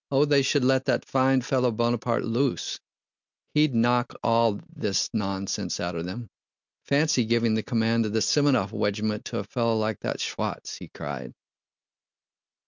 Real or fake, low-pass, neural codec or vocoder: real; 7.2 kHz; none